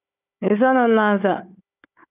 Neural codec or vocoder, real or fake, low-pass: codec, 16 kHz, 4 kbps, FunCodec, trained on Chinese and English, 50 frames a second; fake; 3.6 kHz